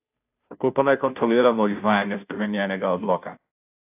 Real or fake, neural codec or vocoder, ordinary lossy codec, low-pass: fake; codec, 16 kHz, 0.5 kbps, FunCodec, trained on Chinese and English, 25 frames a second; AAC, 32 kbps; 3.6 kHz